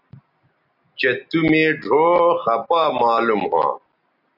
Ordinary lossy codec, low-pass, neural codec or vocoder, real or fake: AAC, 48 kbps; 5.4 kHz; none; real